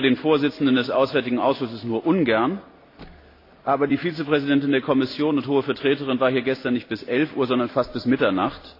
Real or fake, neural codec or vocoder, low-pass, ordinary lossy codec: real; none; 5.4 kHz; AAC, 32 kbps